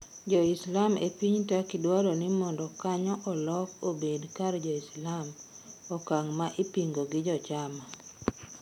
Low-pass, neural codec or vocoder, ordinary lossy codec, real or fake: 19.8 kHz; none; none; real